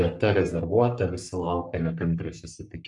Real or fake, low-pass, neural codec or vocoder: fake; 10.8 kHz; codec, 44.1 kHz, 3.4 kbps, Pupu-Codec